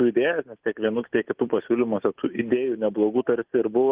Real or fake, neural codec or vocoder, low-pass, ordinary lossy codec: real; none; 3.6 kHz; Opus, 32 kbps